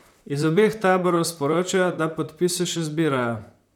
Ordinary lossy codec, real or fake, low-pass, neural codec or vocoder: none; fake; 19.8 kHz; vocoder, 44.1 kHz, 128 mel bands, Pupu-Vocoder